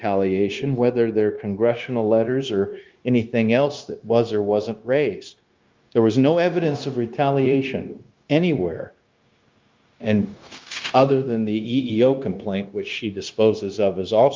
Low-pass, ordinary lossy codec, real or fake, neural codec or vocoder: 7.2 kHz; Opus, 32 kbps; fake; codec, 16 kHz, 0.9 kbps, LongCat-Audio-Codec